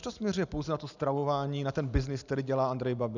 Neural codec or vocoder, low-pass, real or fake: none; 7.2 kHz; real